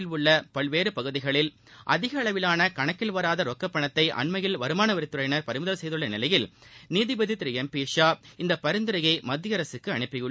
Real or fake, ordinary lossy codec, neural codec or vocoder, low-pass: real; none; none; none